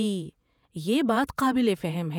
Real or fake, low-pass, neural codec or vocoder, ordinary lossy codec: fake; 19.8 kHz; vocoder, 48 kHz, 128 mel bands, Vocos; none